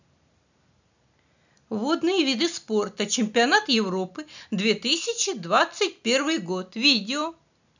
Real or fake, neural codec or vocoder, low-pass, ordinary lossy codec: real; none; 7.2 kHz; none